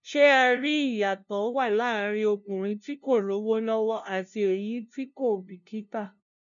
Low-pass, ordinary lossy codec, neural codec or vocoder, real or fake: 7.2 kHz; none; codec, 16 kHz, 0.5 kbps, FunCodec, trained on LibriTTS, 25 frames a second; fake